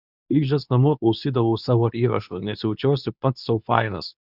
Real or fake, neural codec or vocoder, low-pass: fake; codec, 24 kHz, 0.9 kbps, WavTokenizer, medium speech release version 2; 5.4 kHz